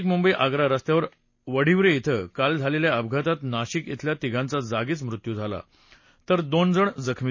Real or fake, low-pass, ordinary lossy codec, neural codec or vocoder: real; 7.2 kHz; MP3, 32 kbps; none